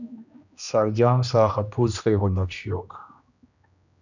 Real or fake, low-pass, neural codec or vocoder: fake; 7.2 kHz; codec, 16 kHz, 1 kbps, X-Codec, HuBERT features, trained on general audio